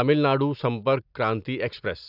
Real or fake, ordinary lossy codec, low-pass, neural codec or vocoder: real; none; 5.4 kHz; none